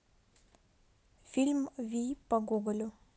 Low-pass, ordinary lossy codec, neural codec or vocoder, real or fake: none; none; none; real